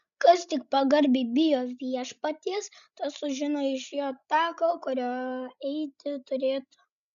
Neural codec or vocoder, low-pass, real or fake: codec, 16 kHz, 16 kbps, FreqCodec, larger model; 7.2 kHz; fake